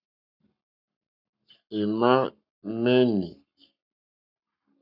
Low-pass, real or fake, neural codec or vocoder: 5.4 kHz; fake; codec, 44.1 kHz, 7.8 kbps, Pupu-Codec